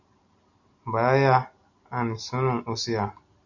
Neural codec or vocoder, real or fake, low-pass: none; real; 7.2 kHz